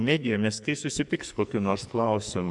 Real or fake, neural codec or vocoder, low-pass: fake; codec, 44.1 kHz, 2.6 kbps, SNAC; 10.8 kHz